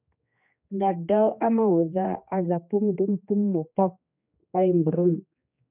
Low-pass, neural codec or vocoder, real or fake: 3.6 kHz; codec, 16 kHz, 2 kbps, X-Codec, HuBERT features, trained on general audio; fake